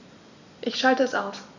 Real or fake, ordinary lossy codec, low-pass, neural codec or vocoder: real; none; 7.2 kHz; none